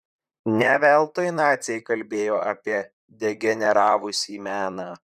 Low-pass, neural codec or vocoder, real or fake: 14.4 kHz; vocoder, 44.1 kHz, 128 mel bands, Pupu-Vocoder; fake